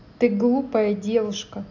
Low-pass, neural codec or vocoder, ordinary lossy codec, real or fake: 7.2 kHz; none; none; real